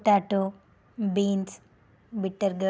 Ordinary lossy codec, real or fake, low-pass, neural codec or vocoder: none; real; none; none